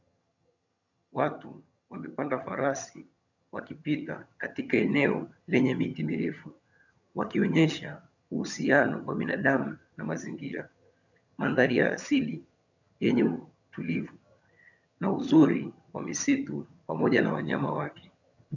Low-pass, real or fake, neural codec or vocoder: 7.2 kHz; fake; vocoder, 22.05 kHz, 80 mel bands, HiFi-GAN